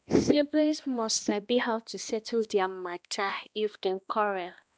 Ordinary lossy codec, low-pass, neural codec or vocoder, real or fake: none; none; codec, 16 kHz, 1 kbps, X-Codec, HuBERT features, trained on balanced general audio; fake